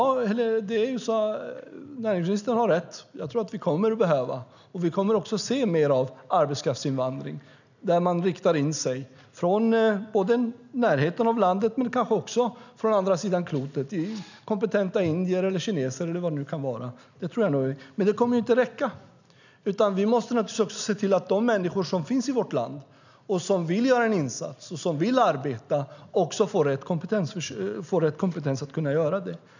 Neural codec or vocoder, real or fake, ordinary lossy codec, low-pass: none; real; none; 7.2 kHz